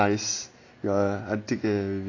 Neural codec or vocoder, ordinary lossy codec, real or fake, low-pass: none; AAC, 32 kbps; real; 7.2 kHz